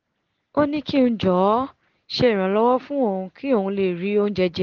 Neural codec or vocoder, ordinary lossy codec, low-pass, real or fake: none; Opus, 16 kbps; 7.2 kHz; real